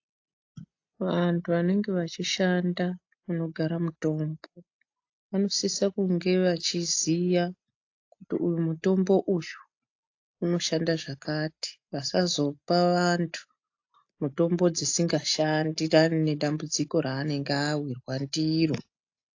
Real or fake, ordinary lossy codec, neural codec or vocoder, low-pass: real; AAC, 48 kbps; none; 7.2 kHz